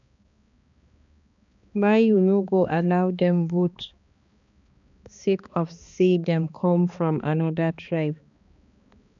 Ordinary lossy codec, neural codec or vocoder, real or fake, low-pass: none; codec, 16 kHz, 2 kbps, X-Codec, HuBERT features, trained on balanced general audio; fake; 7.2 kHz